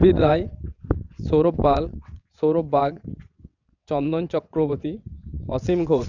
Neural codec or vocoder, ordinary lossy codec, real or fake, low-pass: vocoder, 22.05 kHz, 80 mel bands, WaveNeXt; none; fake; 7.2 kHz